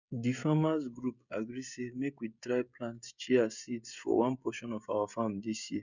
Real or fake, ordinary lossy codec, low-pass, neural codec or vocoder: fake; none; 7.2 kHz; codec, 16 kHz, 16 kbps, FreqCodec, smaller model